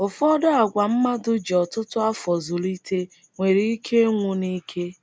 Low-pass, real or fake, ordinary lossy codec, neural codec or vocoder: none; real; none; none